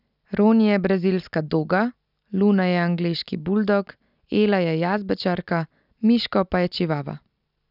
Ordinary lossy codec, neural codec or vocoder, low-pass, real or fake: none; none; 5.4 kHz; real